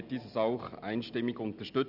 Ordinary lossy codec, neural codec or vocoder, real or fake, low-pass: none; none; real; 5.4 kHz